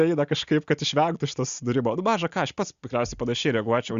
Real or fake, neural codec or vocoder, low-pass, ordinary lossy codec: real; none; 7.2 kHz; Opus, 64 kbps